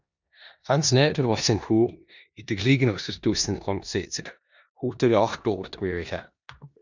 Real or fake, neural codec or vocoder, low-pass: fake; codec, 16 kHz in and 24 kHz out, 0.9 kbps, LongCat-Audio-Codec, four codebook decoder; 7.2 kHz